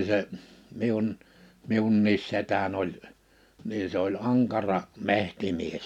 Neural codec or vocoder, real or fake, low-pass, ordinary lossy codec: none; real; 19.8 kHz; none